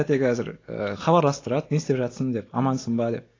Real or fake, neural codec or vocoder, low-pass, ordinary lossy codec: real; none; 7.2 kHz; AAC, 32 kbps